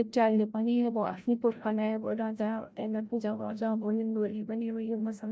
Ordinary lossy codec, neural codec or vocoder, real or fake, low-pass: none; codec, 16 kHz, 0.5 kbps, FreqCodec, larger model; fake; none